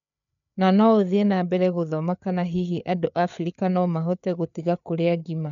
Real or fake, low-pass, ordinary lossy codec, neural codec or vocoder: fake; 7.2 kHz; none; codec, 16 kHz, 4 kbps, FreqCodec, larger model